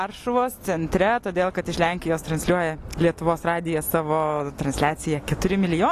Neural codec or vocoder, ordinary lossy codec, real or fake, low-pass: none; AAC, 48 kbps; real; 14.4 kHz